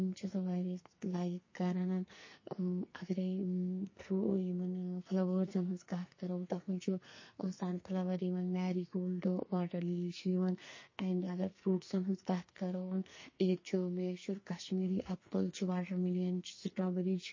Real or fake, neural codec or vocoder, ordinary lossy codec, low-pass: fake; codec, 44.1 kHz, 2.6 kbps, SNAC; MP3, 32 kbps; 7.2 kHz